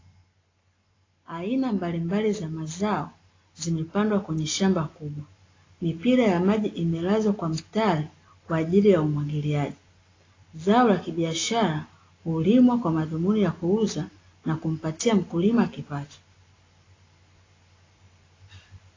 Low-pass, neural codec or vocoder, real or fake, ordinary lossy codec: 7.2 kHz; none; real; AAC, 32 kbps